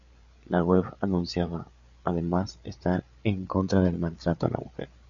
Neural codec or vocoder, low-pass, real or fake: codec, 16 kHz, 8 kbps, FreqCodec, larger model; 7.2 kHz; fake